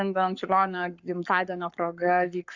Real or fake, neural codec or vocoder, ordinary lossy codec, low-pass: fake; codec, 44.1 kHz, 7.8 kbps, Pupu-Codec; Opus, 64 kbps; 7.2 kHz